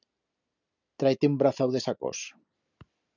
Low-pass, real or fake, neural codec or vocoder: 7.2 kHz; real; none